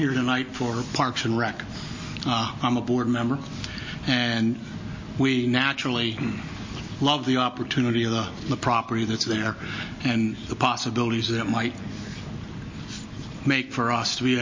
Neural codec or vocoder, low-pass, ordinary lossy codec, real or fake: none; 7.2 kHz; MP3, 32 kbps; real